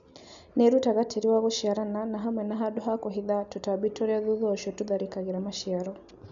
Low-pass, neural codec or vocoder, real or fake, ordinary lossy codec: 7.2 kHz; none; real; none